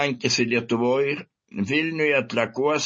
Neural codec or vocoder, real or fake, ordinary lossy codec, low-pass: none; real; MP3, 32 kbps; 9.9 kHz